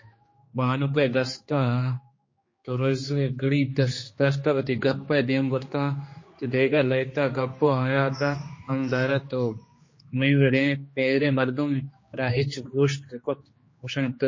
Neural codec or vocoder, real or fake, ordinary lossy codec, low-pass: codec, 16 kHz, 2 kbps, X-Codec, HuBERT features, trained on general audio; fake; MP3, 32 kbps; 7.2 kHz